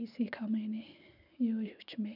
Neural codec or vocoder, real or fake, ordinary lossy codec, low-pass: none; real; none; 5.4 kHz